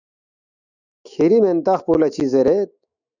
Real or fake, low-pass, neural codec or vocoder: fake; 7.2 kHz; autoencoder, 48 kHz, 128 numbers a frame, DAC-VAE, trained on Japanese speech